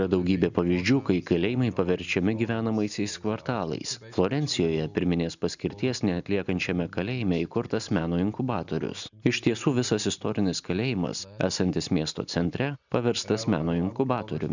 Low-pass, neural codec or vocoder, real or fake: 7.2 kHz; none; real